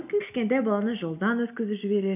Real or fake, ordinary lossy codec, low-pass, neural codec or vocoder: real; none; 3.6 kHz; none